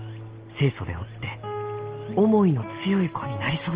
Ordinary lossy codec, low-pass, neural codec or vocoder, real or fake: Opus, 16 kbps; 3.6 kHz; none; real